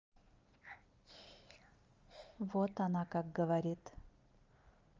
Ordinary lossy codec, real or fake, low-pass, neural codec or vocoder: Opus, 32 kbps; real; 7.2 kHz; none